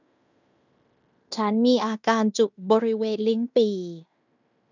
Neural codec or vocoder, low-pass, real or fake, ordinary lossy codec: codec, 16 kHz in and 24 kHz out, 0.9 kbps, LongCat-Audio-Codec, fine tuned four codebook decoder; 7.2 kHz; fake; none